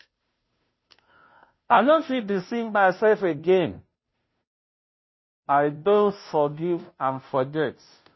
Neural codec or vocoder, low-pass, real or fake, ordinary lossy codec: codec, 16 kHz, 0.5 kbps, FunCodec, trained on Chinese and English, 25 frames a second; 7.2 kHz; fake; MP3, 24 kbps